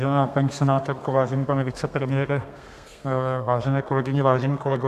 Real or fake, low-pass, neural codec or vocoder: fake; 14.4 kHz; codec, 32 kHz, 1.9 kbps, SNAC